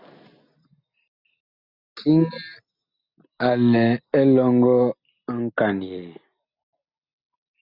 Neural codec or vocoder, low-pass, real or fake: none; 5.4 kHz; real